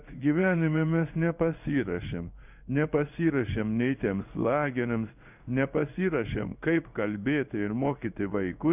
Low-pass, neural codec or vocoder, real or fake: 3.6 kHz; codec, 16 kHz in and 24 kHz out, 1 kbps, XY-Tokenizer; fake